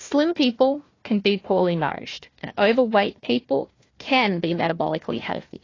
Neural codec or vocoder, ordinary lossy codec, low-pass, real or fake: codec, 16 kHz, 1 kbps, FunCodec, trained on Chinese and English, 50 frames a second; AAC, 32 kbps; 7.2 kHz; fake